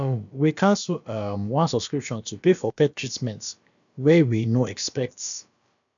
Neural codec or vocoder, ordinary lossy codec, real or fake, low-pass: codec, 16 kHz, about 1 kbps, DyCAST, with the encoder's durations; MP3, 96 kbps; fake; 7.2 kHz